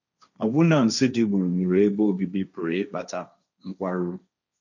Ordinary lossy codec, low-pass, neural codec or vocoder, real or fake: none; 7.2 kHz; codec, 16 kHz, 1.1 kbps, Voila-Tokenizer; fake